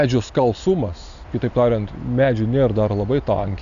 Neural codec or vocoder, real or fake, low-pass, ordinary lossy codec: none; real; 7.2 kHz; MP3, 96 kbps